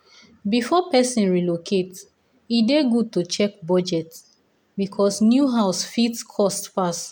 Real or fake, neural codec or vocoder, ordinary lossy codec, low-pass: real; none; none; none